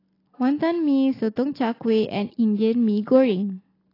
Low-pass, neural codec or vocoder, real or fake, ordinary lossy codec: 5.4 kHz; none; real; AAC, 24 kbps